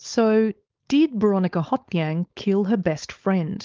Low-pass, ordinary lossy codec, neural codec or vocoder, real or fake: 7.2 kHz; Opus, 32 kbps; codec, 16 kHz, 4.8 kbps, FACodec; fake